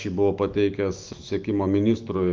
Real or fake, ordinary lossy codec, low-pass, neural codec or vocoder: fake; Opus, 32 kbps; 7.2 kHz; autoencoder, 48 kHz, 128 numbers a frame, DAC-VAE, trained on Japanese speech